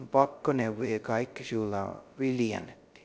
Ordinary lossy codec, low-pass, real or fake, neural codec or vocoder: none; none; fake; codec, 16 kHz, 0.2 kbps, FocalCodec